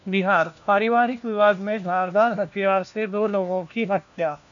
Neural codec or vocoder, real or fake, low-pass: codec, 16 kHz, 1 kbps, FunCodec, trained on LibriTTS, 50 frames a second; fake; 7.2 kHz